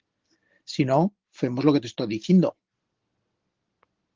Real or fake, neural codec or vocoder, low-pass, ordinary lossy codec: real; none; 7.2 kHz; Opus, 16 kbps